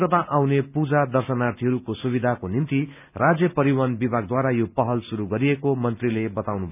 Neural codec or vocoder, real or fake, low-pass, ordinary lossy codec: none; real; 3.6 kHz; none